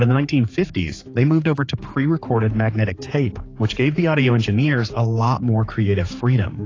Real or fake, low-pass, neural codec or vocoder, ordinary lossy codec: fake; 7.2 kHz; codec, 16 kHz, 4 kbps, X-Codec, HuBERT features, trained on general audio; AAC, 32 kbps